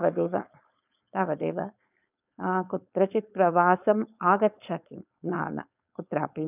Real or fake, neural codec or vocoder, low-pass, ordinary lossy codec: fake; codec, 16 kHz, 4.8 kbps, FACodec; 3.6 kHz; none